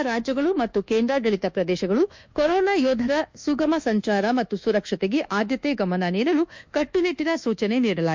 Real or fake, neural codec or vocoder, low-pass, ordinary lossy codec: fake; codec, 24 kHz, 1.2 kbps, DualCodec; 7.2 kHz; MP3, 64 kbps